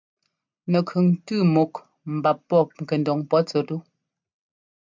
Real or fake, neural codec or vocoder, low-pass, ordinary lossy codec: real; none; 7.2 kHz; MP3, 64 kbps